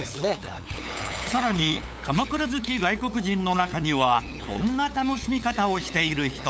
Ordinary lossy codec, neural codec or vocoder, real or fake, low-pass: none; codec, 16 kHz, 8 kbps, FunCodec, trained on LibriTTS, 25 frames a second; fake; none